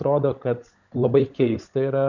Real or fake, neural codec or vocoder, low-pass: fake; codec, 16 kHz, 16 kbps, FunCodec, trained on LibriTTS, 50 frames a second; 7.2 kHz